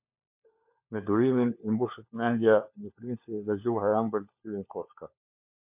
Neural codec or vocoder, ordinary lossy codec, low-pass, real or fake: codec, 16 kHz, 4 kbps, FunCodec, trained on LibriTTS, 50 frames a second; MP3, 32 kbps; 3.6 kHz; fake